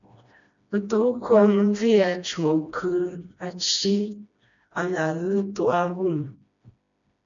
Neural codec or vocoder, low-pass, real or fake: codec, 16 kHz, 1 kbps, FreqCodec, smaller model; 7.2 kHz; fake